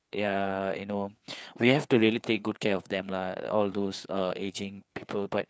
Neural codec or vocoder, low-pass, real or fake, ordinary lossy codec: codec, 16 kHz, 4 kbps, FreqCodec, larger model; none; fake; none